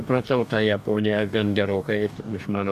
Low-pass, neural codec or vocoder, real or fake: 14.4 kHz; codec, 44.1 kHz, 2.6 kbps, DAC; fake